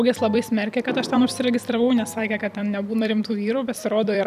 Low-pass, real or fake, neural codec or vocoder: 14.4 kHz; fake; vocoder, 44.1 kHz, 128 mel bands every 256 samples, BigVGAN v2